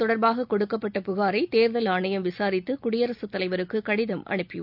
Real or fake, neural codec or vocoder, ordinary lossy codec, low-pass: real; none; none; 5.4 kHz